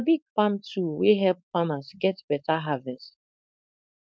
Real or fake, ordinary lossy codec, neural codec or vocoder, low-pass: fake; none; codec, 16 kHz, 4.8 kbps, FACodec; none